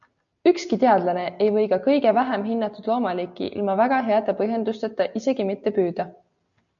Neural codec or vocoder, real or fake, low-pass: none; real; 7.2 kHz